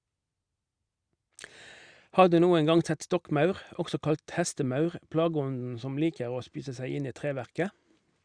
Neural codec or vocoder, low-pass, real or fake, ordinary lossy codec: none; 9.9 kHz; real; Opus, 64 kbps